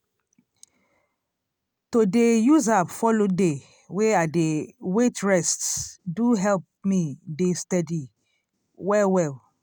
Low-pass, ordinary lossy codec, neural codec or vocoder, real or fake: none; none; none; real